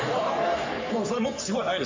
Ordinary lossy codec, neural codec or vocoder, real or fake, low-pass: MP3, 48 kbps; codec, 44.1 kHz, 3.4 kbps, Pupu-Codec; fake; 7.2 kHz